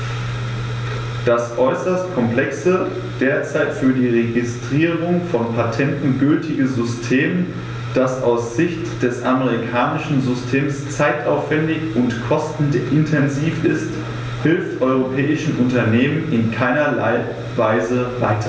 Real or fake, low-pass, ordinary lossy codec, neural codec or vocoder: real; none; none; none